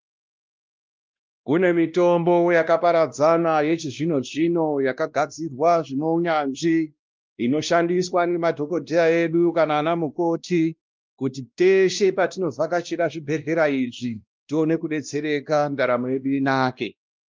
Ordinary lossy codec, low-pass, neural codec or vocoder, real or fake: Opus, 32 kbps; 7.2 kHz; codec, 16 kHz, 1 kbps, X-Codec, WavLM features, trained on Multilingual LibriSpeech; fake